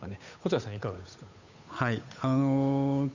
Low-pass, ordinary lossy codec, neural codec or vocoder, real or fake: 7.2 kHz; none; codec, 16 kHz, 8 kbps, FunCodec, trained on Chinese and English, 25 frames a second; fake